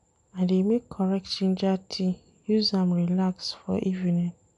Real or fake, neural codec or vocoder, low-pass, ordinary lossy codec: real; none; 10.8 kHz; none